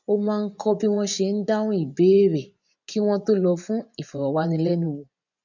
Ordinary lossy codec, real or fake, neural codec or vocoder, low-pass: none; fake; vocoder, 24 kHz, 100 mel bands, Vocos; 7.2 kHz